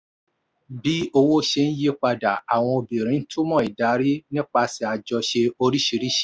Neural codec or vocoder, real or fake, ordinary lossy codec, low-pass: none; real; none; none